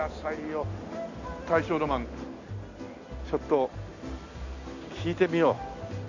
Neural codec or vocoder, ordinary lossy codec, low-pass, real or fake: none; none; 7.2 kHz; real